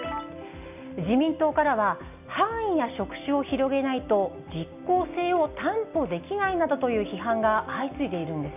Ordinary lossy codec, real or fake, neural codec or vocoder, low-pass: none; real; none; 3.6 kHz